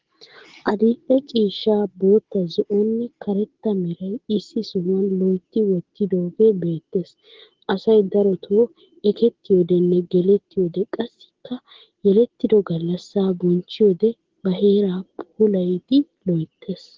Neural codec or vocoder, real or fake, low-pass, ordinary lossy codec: none; real; 7.2 kHz; Opus, 16 kbps